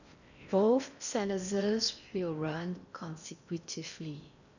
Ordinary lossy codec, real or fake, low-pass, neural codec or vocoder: none; fake; 7.2 kHz; codec, 16 kHz in and 24 kHz out, 0.6 kbps, FocalCodec, streaming, 4096 codes